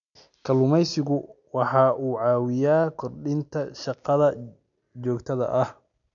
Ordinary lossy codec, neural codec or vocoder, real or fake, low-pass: none; none; real; 7.2 kHz